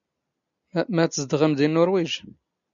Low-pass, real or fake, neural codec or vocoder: 7.2 kHz; real; none